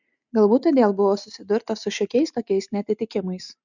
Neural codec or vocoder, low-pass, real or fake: none; 7.2 kHz; real